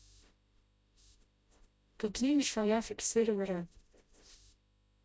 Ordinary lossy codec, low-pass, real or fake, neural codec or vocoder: none; none; fake; codec, 16 kHz, 0.5 kbps, FreqCodec, smaller model